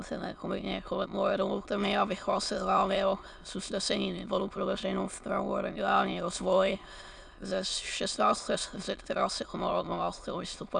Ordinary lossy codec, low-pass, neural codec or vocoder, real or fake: MP3, 96 kbps; 9.9 kHz; autoencoder, 22.05 kHz, a latent of 192 numbers a frame, VITS, trained on many speakers; fake